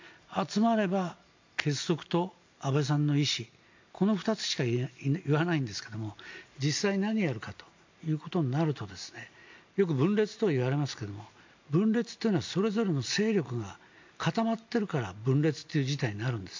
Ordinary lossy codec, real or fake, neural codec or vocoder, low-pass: MP3, 48 kbps; real; none; 7.2 kHz